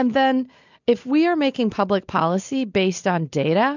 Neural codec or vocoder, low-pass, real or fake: none; 7.2 kHz; real